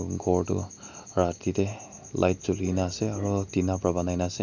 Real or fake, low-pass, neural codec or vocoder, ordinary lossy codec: real; 7.2 kHz; none; none